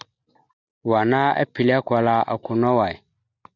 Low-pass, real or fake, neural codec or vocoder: 7.2 kHz; real; none